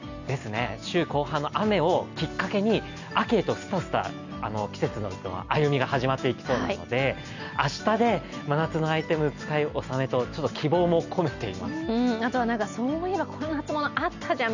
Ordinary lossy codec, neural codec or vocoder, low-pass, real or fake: none; none; 7.2 kHz; real